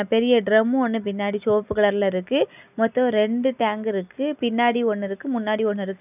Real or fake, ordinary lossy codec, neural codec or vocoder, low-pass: real; none; none; 3.6 kHz